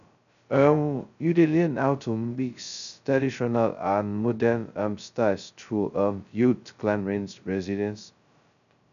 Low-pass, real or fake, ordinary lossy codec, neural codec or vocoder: 7.2 kHz; fake; none; codec, 16 kHz, 0.2 kbps, FocalCodec